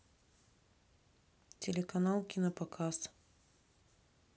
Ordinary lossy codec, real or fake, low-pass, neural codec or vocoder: none; real; none; none